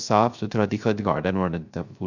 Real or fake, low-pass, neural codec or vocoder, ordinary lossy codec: fake; 7.2 kHz; codec, 16 kHz, 0.3 kbps, FocalCodec; none